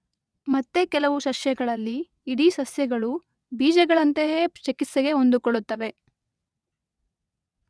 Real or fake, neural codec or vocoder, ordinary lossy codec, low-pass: fake; vocoder, 22.05 kHz, 80 mel bands, WaveNeXt; none; none